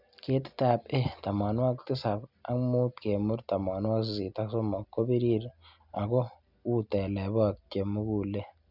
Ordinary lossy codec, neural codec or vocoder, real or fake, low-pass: none; none; real; 5.4 kHz